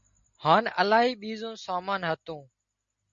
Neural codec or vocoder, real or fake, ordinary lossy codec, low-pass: none; real; Opus, 64 kbps; 7.2 kHz